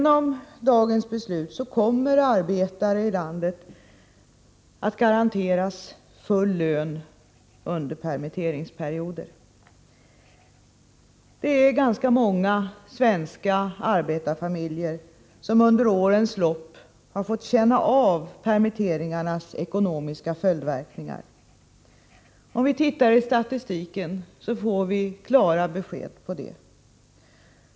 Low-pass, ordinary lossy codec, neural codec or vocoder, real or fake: none; none; none; real